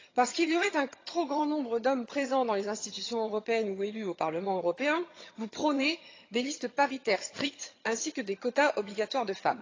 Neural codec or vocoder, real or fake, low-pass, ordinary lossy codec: vocoder, 22.05 kHz, 80 mel bands, HiFi-GAN; fake; 7.2 kHz; AAC, 32 kbps